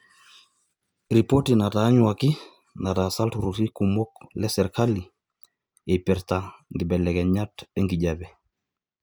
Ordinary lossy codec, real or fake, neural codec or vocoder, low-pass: none; real; none; none